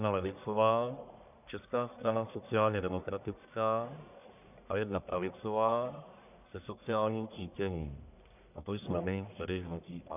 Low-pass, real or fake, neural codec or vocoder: 3.6 kHz; fake; codec, 44.1 kHz, 1.7 kbps, Pupu-Codec